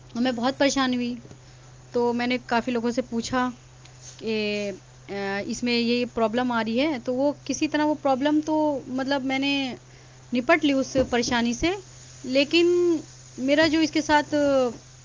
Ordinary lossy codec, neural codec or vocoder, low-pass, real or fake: Opus, 32 kbps; none; 7.2 kHz; real